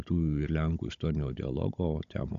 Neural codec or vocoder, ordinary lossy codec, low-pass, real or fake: codec, 16 kHz, 16 kbps, FunCodec, trained on Chinese and English, 50 frames a second; AAC, 64 kbps; 7.2 kHz; fake